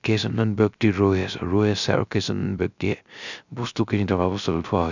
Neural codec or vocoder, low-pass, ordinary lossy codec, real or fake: codec, 16 kHz, 0.3 kbps, FocalCodec; 7.2 kHz; none; fake